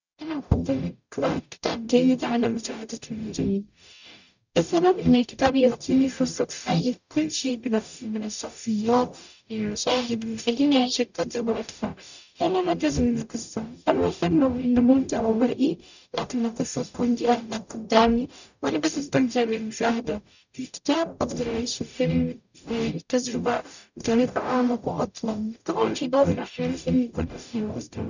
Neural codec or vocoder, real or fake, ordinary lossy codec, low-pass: codec, 44.1 kHz, 0.9 kbps, DAC; fake; none; 7.2 kHz